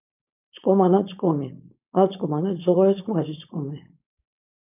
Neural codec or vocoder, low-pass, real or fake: codec, 16 kHz, 4.8 kbps, FACodec; 3.6 kHz; fake